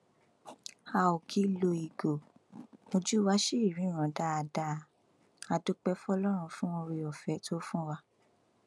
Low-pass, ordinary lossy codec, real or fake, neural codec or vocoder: none; none; real; none